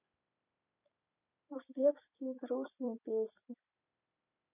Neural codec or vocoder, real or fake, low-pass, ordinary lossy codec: vocoder, 44.1 kHz, 128 mel bands every 256 samples, BigVGAN v2; fake; 3.6 kHz; none